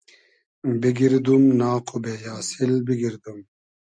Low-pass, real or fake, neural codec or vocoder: 9.9 kHz; real; none